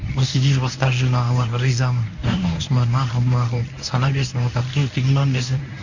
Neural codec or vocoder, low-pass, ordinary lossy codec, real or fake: codec, 24 kHz, 0.9 kbps, WavTokenizer, medium speech release version 1; 7.2 kHz; none; fake